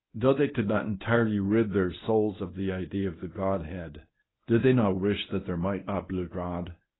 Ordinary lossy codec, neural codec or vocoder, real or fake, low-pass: AAC, 16 kbps; codec, 24 kHz, 0.9 kbps, WavTokenizer, medium speech release version 1; fake; 7.2 kHz